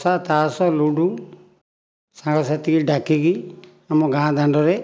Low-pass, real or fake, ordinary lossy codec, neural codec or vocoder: none; real; none; none